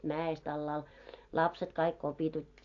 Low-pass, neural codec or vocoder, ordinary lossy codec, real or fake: 7.2 kHz; none; AAC, 48 kbps; real